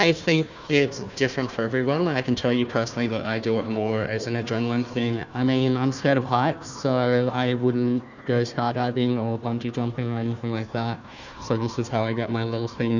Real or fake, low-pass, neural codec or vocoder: fake; 7.2 kHz; codec, 16 kHz, 1 kbps, FunCodec, trained on Chinese and English, 50 frames a second